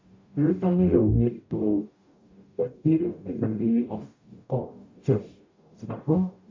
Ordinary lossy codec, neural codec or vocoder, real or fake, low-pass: MP3, 64 kbps; codec, 44.1 kHz, 0.9 kbps, DAC; fake; 7.2 kHz